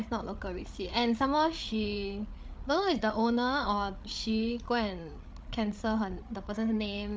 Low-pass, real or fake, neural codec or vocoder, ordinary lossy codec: none; fake; codec, 16 kHz, 8 kbps, FreqCodec, larger model; none